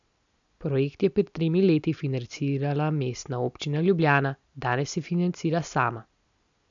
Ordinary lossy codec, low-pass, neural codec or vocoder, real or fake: MP3, 96 kbps; 7.2 kHz; none; real